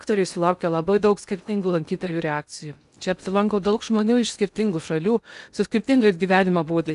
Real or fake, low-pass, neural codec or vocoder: fake; 10.8 kHz; codec, 16 kHz in and 24 kHz out, 0.6 kbps, FocalCodec, streaming, 2048 codes